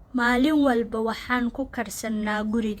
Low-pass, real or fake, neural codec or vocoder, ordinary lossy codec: 19.8 kHz; fake; vocoder, 48 kHz, 128 mel bands, Vocos; Opus, 64 kbps